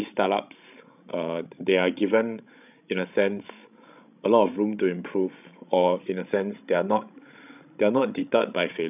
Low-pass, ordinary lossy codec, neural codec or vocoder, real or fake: 3.6 kHz; none; codec, 16 kHz, 16 kbps, FreqCodec, larger model; fake